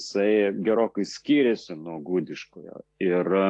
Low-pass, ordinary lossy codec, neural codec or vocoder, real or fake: 10.8 kHz; AAC, 48 kbps; none; real